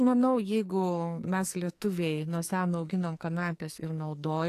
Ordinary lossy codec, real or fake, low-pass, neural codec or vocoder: AAC, 64 kbps; fake; 14.4 kHz; codec, 44.1 kHz, 2.6 kbps, SNAC